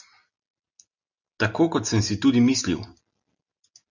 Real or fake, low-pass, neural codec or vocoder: real; 7.2 kHz; none